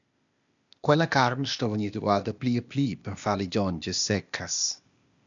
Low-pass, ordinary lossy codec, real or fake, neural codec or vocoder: 7.2 kHz; MP3, 96 kbps; fake; codec, 16 kHz, 0.8 kbps, ZipCodec